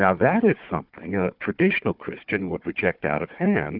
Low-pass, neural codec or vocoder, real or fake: 5.4 kHz; codec, 16 kHz, 4 kbps, FunCodec, trained on Chinese and English, 50 frames a second; fake